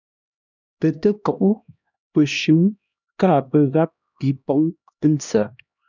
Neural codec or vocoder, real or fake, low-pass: codec, 16 kHz, 1 kbps, X-Codec, HuBERT features, trained on LibriSpeech; fake; 7.2 kHz